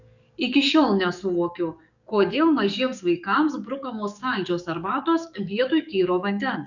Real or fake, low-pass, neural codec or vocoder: fake; 7.2 kHz; codec, 44.1 kHz, 7.8 kbps, DAC